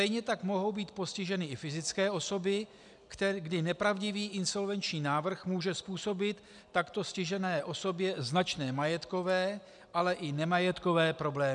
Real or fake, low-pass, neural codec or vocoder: real; 10.8 kHz; none